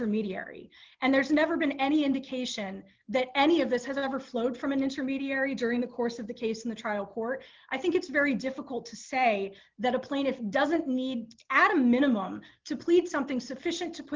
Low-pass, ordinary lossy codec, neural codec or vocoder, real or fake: 7.2 kHz; Opus, 32 kbps; none; real